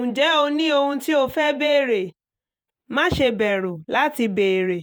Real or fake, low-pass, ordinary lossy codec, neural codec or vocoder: fake; none; none; vocoder, 48 kHz, 128 mel bands, Vocos